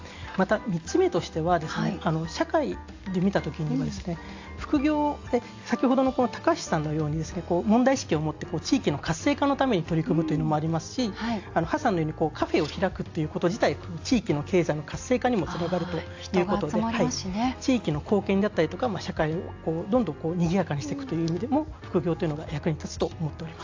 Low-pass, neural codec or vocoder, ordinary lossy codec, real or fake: 7.2 kHz; none; AAC, 48 kbps; real